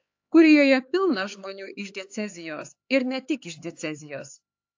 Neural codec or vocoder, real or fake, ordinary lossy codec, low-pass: codec, 16 kHz, 4 kbps, X-Codec, HuBERT features, trained on LibriSpeech; fake; AAC, 48 kbps; 7.2 kHz